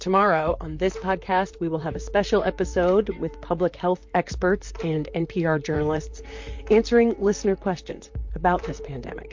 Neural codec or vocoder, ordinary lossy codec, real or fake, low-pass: vocoder, 44.1 kHz, 128 mel bands, Pupu-Vocoder; MP3, 48 kbps; fake; 7.2 kHz